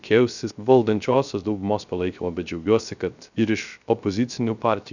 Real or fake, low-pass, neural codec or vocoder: fake; 7.2 kHz; codec, 16 kHz, 0.3 kbps, FocalCodec